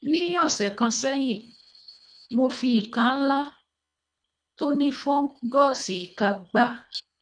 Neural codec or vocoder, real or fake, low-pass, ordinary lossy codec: codec, 24 kHz, 1.5 kbps, HILCodec; fake; 9.9 kHz; none